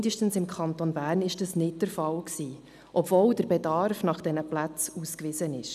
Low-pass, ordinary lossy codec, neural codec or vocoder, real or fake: 14.4 kHz; none; none; real